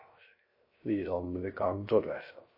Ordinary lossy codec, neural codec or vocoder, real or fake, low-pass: MP3, 24 kbps; codec, 16 kHz, 0.3 kbps, FocalCodec; fake; 5.4 kHz